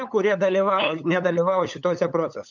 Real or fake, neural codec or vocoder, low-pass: fake; codec, 16 kHz, 8 kbps, FunCodec, trained on LibriTTS, 25 frames a second; 7.2 kHz